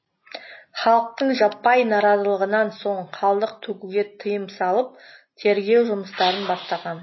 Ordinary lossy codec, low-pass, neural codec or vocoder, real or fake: MP3, 24 kbps; 7.2 kHz; none; real